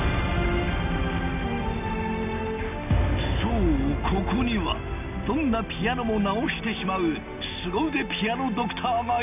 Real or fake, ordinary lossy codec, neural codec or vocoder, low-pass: real; none; none; 3.6 kHz